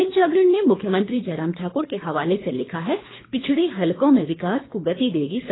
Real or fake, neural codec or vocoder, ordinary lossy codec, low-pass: fake; codec, 24 kHz, 3 kbps, HILCodec; AAC, 16 kbps; 7.2 kHz